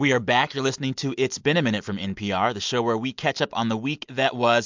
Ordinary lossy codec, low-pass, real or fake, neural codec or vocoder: MP3, 64 kbps; 7.2 kHz; real; none